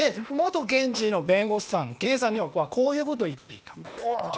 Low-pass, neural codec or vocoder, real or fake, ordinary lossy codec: none; codec, 16 kHz, 0.8 kbps, ZipCodec; fake; none